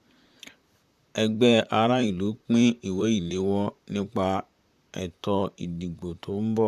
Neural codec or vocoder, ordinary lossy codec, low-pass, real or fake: vocoder, 44.1 kHz, 128 mel bands, Pupu-Vocoder; none; 14.4 kHz; fake